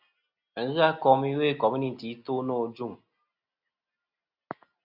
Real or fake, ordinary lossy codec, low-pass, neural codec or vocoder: real; Opus, 64 kbps; 5.4 kHz; none